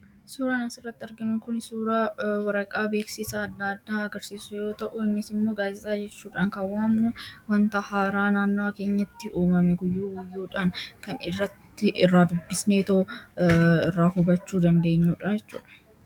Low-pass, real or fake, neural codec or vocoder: 19.8 kHz; fake; codec, 44.1 kHz, 7.8 kbps, DAC